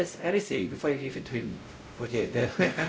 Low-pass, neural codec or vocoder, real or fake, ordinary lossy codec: none; codec, 16 kHz, 0.5 kbps, X-Codec, WavLM features, trained on Multilingual LibriSpeech; fake; none